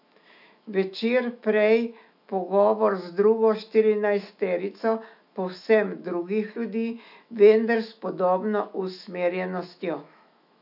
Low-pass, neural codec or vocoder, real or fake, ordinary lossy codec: 5.4 kHz; autoencoder, 48 kHz, 128 numbers a frame, DAC-VAE, trained on Japanese speech; fake; none